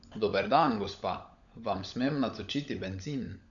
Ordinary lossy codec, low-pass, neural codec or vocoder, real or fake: none; 7.2 kHz; codec, 16 kHz, 16 kbps, FunCodec, trained on LibriTTS, 50 frames a second; fake